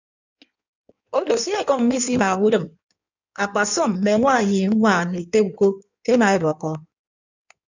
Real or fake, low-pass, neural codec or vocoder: fake; 7.2 kHz; codec, 16 kHz in and 24 kHz out, 1.1 kbps, FireRedTTS-2 codec